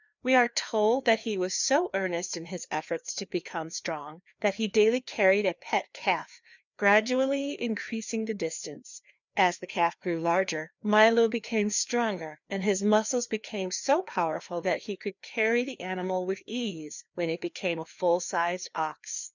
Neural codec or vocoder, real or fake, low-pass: codec, 16 kHz, 2 kbps, FreqCodec, larger model; fake; 7.2 kHz